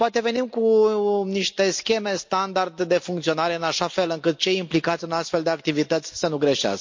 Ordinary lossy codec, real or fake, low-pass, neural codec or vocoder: MP3, 48 kbps; real; 7.2 kHz; none